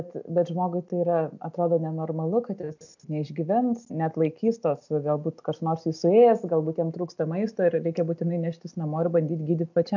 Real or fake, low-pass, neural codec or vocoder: real; 7.2 kHz; none